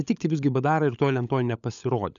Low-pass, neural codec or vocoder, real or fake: 7.2 kHz; codec, 16 kHz, 16 kbps, FunCodec, trained on LibriTTS, 50 frames a second; fake